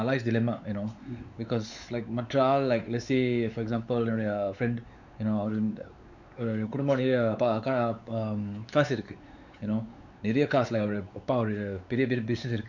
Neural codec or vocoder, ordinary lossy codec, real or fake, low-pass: codec, 16 kHz, 4 kbps, X-Codec, WavLM features, trained on Multilingual LibriSpeech; none; fake; 7.2 kHz